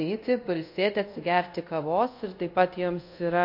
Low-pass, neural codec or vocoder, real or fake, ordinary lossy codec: 5.4 kHz; codec, 24 kHz, 0.5 kbps, DualCodec; fake; MP3, 32 kbps